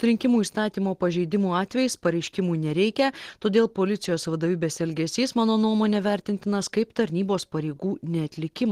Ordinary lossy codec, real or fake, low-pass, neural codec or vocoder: Opus, 16 kbps; real; 14.4 kHz; none